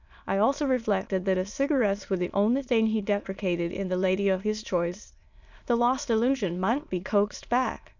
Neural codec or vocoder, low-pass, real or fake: autoencoder, 22.05 kHz, a latent of 192 numbers a frame, VITS, trained on many speakers; 7.2 kHz; fake